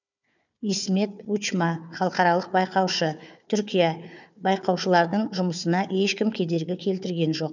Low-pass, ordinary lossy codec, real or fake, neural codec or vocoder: 7.2 kHz; none; fake; codec, 16 kHz, 4 kbps, FunCodec, trained on Chinese and English, 50 frames a second